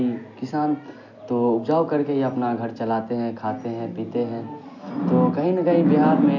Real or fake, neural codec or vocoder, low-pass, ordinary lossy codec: real; none; 7.2 kHz; none